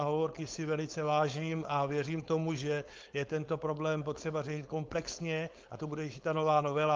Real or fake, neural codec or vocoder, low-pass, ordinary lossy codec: fake; codec, 16 kHz, 4.8 kbps, FACodec; 7.2 kHz; Opus, 32 kbps